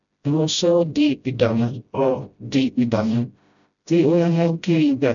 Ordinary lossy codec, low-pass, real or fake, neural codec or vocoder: none; 7.2 kHz; fake; codec, 16 kHz, 0.5 kbps, FreqCodec, smaller model